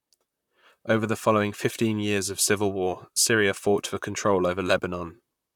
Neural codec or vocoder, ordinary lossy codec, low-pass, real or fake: vocoder, 44.1 kHz, 128 mel bands, Pupu-Vocoder; none; 19.8 kHz; fake